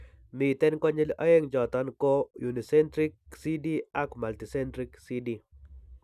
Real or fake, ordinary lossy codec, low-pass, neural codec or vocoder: real; none; 14.4 kHz; none